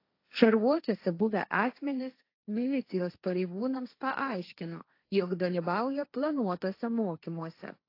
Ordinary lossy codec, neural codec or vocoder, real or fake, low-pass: AAC, 32 kbps; codec, 16 kHz, 1.1 kbps, Voila-Tokenizer; fake; 5.4 kHz